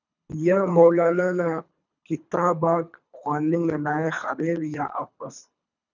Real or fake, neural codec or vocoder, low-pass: fake; codec, 24 kHz, 3 kbps, HILCodec; 7.2 kHz